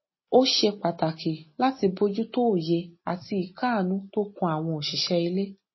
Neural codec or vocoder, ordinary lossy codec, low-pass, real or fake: none; MP3, 24 kbps; 7.2 kHz; real